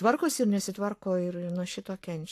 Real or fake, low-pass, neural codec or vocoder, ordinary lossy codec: fake; 14.4 kHz; codec, 44.1 kHz, 7.8 kbps, Pupu-Codec; AAC, 64 kbps